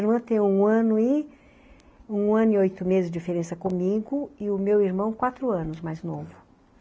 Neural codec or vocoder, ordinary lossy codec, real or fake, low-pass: none; none; real; none